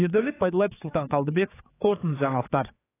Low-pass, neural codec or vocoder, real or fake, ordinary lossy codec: 3.6 kHz; codec, 16 kHz, 4 kbps, X-Codec, HuBERT features, trained on general audio; fake; AAC, 16 kbps